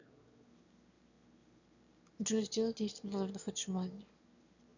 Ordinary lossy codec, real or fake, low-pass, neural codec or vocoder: none; fake; 7.2 kHz; autoencoder, 22.05 kHz, a latent of 192 numbers a frame, VITS, trained on one speaker